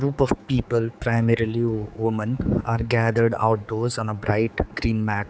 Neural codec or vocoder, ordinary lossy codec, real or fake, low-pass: codec, 16 kHz, 4 kbps, X-Codec, HuBERT features, trained on general audio; none; fake; none